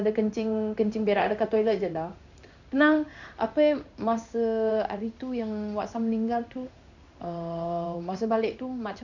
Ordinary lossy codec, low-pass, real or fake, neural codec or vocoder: none; 7.2 kHz; fake; codec, 16 kHz in and 24 kHz out, 1 kbps, XY-Tokenizer